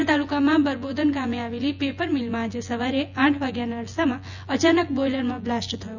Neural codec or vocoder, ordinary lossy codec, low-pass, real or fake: vocoder, 24 kHz, 100 mel bands, Vocos; none; 7.2 kHz; fake